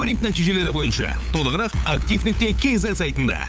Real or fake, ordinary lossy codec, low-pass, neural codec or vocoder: fake; none; none; codec, 16 kHz, 8 kbps, FunCodec, trained on LibriTTS, 25 frames a second